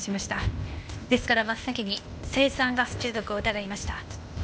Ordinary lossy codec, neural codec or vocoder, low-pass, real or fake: none; codec, 16 kHz, 0.8 kbps, ZipCodec; none; fake